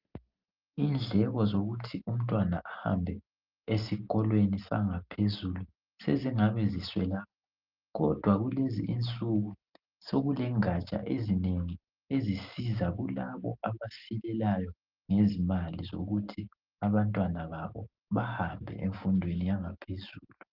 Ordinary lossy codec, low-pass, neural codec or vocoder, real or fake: Opus, 24 kbps; 5.4 kHz; none; real